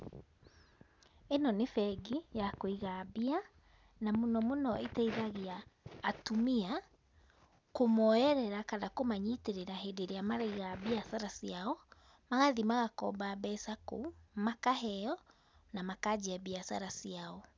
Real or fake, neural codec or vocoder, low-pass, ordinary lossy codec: real; none; none; none